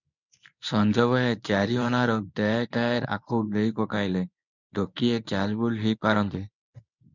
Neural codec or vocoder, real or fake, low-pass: codec, 24 kHz, 0.9 kbps, WavTokenizer, medium speech release version 1; fake; 7.2 kHz